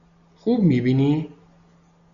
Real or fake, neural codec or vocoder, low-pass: real; none; 7.2 kHz